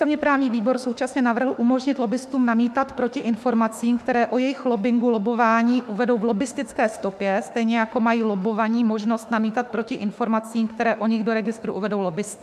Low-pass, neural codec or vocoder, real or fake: 14.4 kHz; autoencoder, 48 kHz, 32 numbers a frame, DAC-VAE, trained on Japanese speech; fake